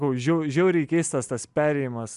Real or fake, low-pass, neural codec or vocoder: real; 10.8 kHz; none